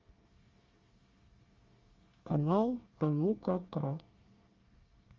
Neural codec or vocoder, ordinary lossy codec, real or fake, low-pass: codec, 24 kHz, 1 kbps, SNAC; Opus, 32 kbps; fake; 7.2 kHz